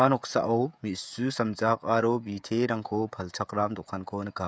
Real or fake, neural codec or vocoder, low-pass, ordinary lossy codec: fake; codec, 16 kHz, 16 kbps, FreqCodec, smaller model; none; none